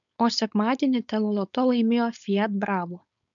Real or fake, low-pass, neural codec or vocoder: fake; 7.2 kHz; codec, 16 kHz, 4.8 kbps, FACodec